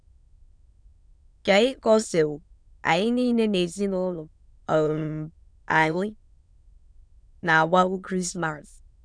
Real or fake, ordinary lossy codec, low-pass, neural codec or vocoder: fake; none; 9.9 kHz; autoencoder, 22.05 kHz, a latent of 192 numbers a frame, VITS, trained on many speakers